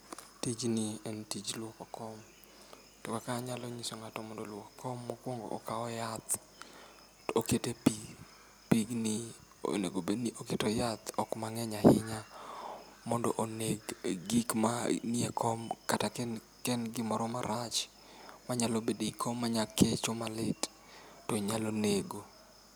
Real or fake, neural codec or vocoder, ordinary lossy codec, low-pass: real; none; none; none